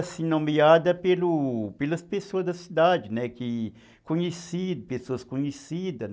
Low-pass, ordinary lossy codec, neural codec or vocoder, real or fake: none; none; none; real